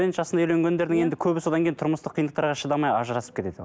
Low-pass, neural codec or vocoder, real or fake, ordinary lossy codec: none; none; real; none